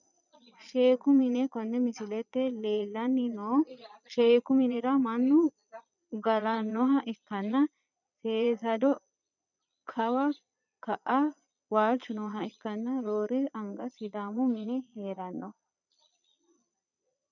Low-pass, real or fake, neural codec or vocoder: 7.2 kHz; fake; vocoder, 22.05 kHz, 80 mel bands, Vocos